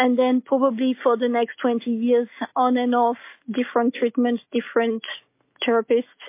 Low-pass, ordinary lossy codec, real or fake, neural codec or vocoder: 3.6 kHz; MP3, 24 kbps; real; none